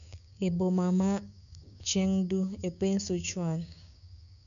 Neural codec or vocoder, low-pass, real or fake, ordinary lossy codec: codec, 16 kHz, 6 kbps, DAC; 7.2 kHz; fake; none